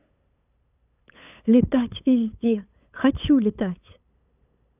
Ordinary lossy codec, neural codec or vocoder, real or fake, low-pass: none; codec, 16 kHz, 8 kbps, FunCodec, trained on LibriTTS, 25 frames a second; fake; 3.6 kHz